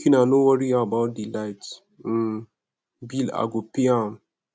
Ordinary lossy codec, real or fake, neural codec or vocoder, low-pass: none; real; none; none